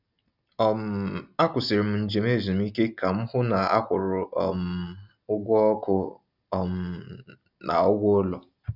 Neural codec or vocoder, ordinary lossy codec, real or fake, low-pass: none; none; real; 5.4 kHz